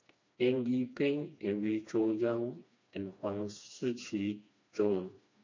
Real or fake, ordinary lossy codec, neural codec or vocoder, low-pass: fake; MP3, 48 kbps; codec, 16 kHz, 2 kbps, FreqCodec, smaller model; 7.2 kHz